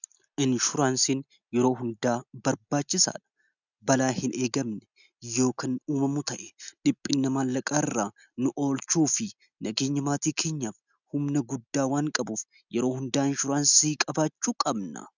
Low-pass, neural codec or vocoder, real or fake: 7.2 kHz; none; real